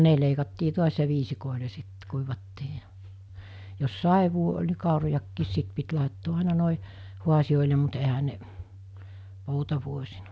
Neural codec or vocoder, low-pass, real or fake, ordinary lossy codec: none; none; real; none